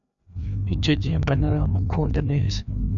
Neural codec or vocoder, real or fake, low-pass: codec, 16 kHz, 2 kbps, FreqCodec, larger model; fake; 7.2 kHz